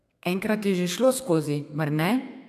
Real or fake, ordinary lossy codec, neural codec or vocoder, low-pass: fake; AAC, 96 kbps; codec, 32 kHz, 1.9 kbps, SNAC; 14.4 kHz